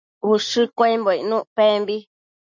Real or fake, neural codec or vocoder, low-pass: real; none; 7.2 kHz